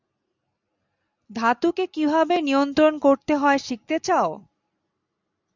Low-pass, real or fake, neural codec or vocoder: 7.2 kHz; real; none